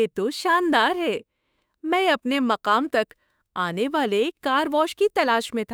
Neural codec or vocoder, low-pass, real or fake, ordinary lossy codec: autoencoder, 48 kHz, 32 numbers a frame, DAC-VAE, trained on Japanese speech; none; fake; none